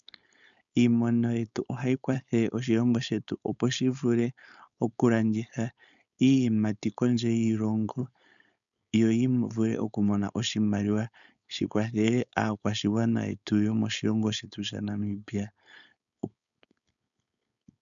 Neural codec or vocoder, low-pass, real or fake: codec, 16 kHz, 4.8 kbps, FACodec; 7.2 kHz; fake